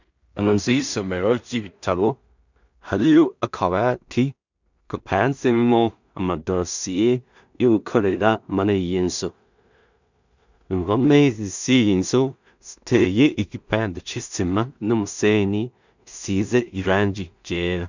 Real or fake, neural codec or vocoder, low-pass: fake; codec, 16 kHz in and 24 kHz out, 0.4 kbps, LongCat-Audio-Codec, two codebook decoder; 7.2 kHz